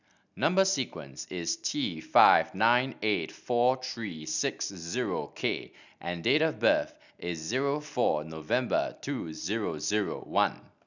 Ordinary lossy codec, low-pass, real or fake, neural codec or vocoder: none; 7.2 kHz; real; none